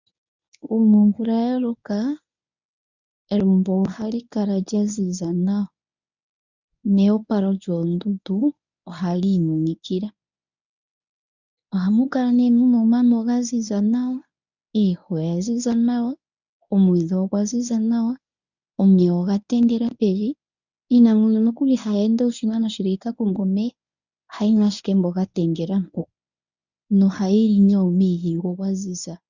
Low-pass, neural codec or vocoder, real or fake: 7.2 kHz; codec, 24 kHz, 0.9 kbps, WavTokenizer, medium speech release version 1; fake